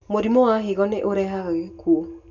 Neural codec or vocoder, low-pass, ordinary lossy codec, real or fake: none; 7.2 kHz; none; real